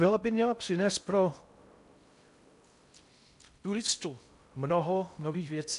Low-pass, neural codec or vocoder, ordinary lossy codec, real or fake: 10.8 kHz; codec, 16 kHz in and 24 kHz out, 0.6 kbps, FocalCodec, streaming, 4096 codes; AAC, 96 kbps; fake